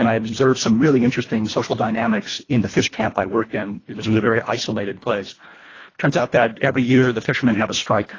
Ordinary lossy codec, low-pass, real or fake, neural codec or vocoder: AAC, 32 kbps; 7.2 kHz; fake; codec, 24 kHz, 1.5 kbps, HILCodec